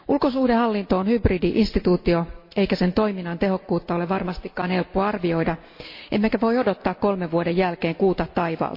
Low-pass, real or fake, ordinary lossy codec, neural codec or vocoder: 5.4 kHz; real; MP3, 32 kbps; none